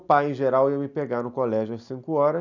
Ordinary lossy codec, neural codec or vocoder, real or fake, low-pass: none; none; real; 7.2 kHz